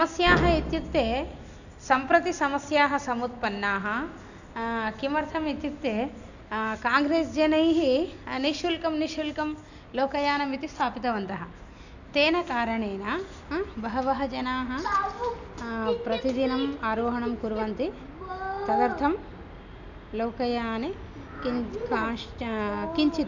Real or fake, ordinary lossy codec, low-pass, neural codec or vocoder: real; none; 7.2 kHz; none